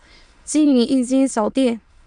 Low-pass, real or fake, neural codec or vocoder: 9.9 kHz; fake; autoencoder, 22.05 kHz, a latent of 192 numbers a frame, VITS, trained on many speakers